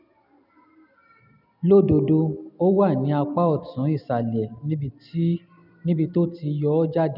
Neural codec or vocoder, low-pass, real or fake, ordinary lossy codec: none; 5.4 kHz; real; none